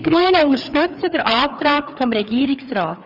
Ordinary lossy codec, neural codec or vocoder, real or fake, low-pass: none; codec, 16 kHz, 4 kbps, FreqCodec, larger model; fake; 5.4 kHz